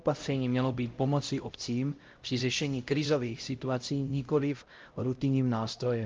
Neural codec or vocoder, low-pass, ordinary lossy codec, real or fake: codec, 16 kHz, 0.5 kbps, X-Codec, HuBERT features, trained on LibriSpeech; 7.2 kHz; Opus, 32 kbps; fake